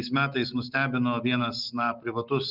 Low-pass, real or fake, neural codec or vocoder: 5.4 kHz; real; none